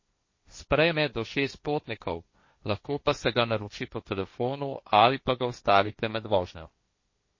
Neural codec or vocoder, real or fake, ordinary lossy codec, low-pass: codec, 16 kHz, 1.1 kbps, Voila-Tokenizer; fake; MP3, 32 kbps; 7.2 kHz